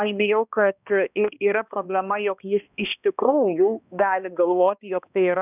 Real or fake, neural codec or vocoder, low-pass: fake; codec, 16 kHz, 1 kbps, X-Codec, HuBERT features, trained on balanced general audio; 3.6 kHz